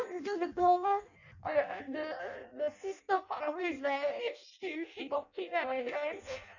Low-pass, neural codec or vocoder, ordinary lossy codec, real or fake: 7.2 kHz; codec, 16 kHz in and 24 kHz out, 0.6 kbps, FireRedTTS-2 codec; none; fake